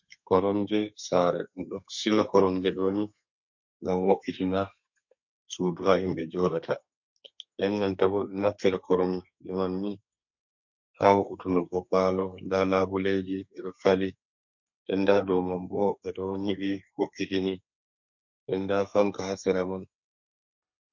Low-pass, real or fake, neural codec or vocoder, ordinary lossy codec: 7.2 kHz; fake; codec, 32 kHz, 1.9 kbps, SNAC; MP3, 48 kbps